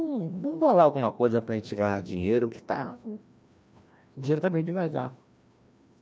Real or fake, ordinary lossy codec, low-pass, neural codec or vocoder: fake; none; none; codec, 16 kHz, 1 kbps, FreqCodec, larger model